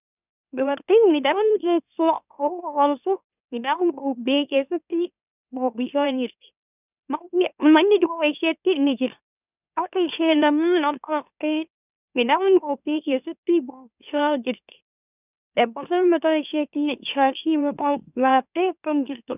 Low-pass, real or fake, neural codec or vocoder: 3.6 kHz; fake; autoencoder, 44.1 kHz, a latent of 192 numbers a frame, MeloTTS